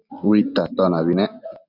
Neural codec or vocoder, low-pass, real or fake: none; 5.4 kHz; real